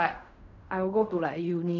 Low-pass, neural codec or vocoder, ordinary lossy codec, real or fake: 7.2 kHz; codec, 16 kHz in and 24 kHz out, 0.4 kbps, LongCat-Audio-Codec, fine tuned four codebook decoder; none; fake